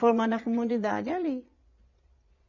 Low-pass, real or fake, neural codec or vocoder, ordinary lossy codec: 7.2 kHz; fake; vocoder, 22.05 kHz, 80 mel bands, Vocos; none